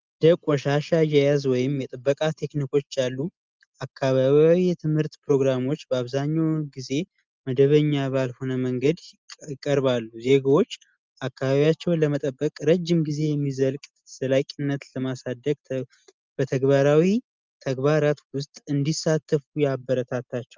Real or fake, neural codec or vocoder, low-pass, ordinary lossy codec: real; none; 7.2 kHz; Opus, 32 kbps